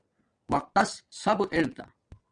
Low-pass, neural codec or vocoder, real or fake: 9.9 kHz; vocoder, 22.05 kHz, 80 mel bands, WaveNeXt; fake